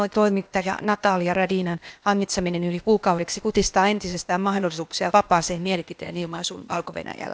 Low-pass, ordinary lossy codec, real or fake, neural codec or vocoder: none; none; fake; codec, 16 kHz, 0.8 kbps, ZipCodec